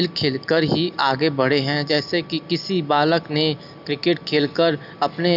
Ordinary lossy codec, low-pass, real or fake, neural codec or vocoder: none; 5.4 kHz; real; none